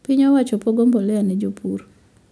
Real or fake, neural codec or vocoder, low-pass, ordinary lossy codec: real; none; none; none